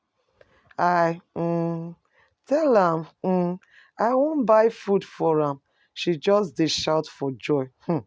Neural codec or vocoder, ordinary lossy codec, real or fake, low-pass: none; none; real; none